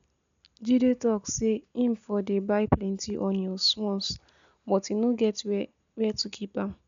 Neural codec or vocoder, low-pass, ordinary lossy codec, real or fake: none; 7.2 kHz; MP3, 64 kbps; real